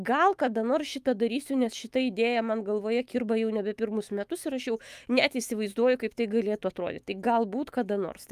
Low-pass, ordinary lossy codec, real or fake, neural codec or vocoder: 14.4 kHz; Opus, 32 kbps; fake; autoencoder, 48 kHz, 128 numbers a frame, DAC-VAE, trained on Japanese speech